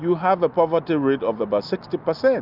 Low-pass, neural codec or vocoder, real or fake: 5.4 kHz; none; real